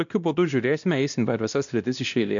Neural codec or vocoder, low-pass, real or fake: codec, 16 kHz, 1 kbps, X-Codec, WavLM features, trained on Multilingual LibriSpeech; 7.2 kHz; fake